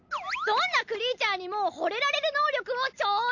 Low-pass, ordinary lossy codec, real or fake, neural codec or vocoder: 7.2 kHz; none; real; none